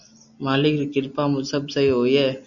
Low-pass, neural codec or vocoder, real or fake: 7.2 kHz; none; real